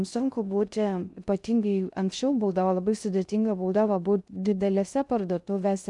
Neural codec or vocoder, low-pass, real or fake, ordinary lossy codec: codec, 16 kHz in and 24 kHz out, 0.6 kbps, FocalCodec, streaming, 2048 codes; 10.8 kHz; fake; MP3, 96 kbps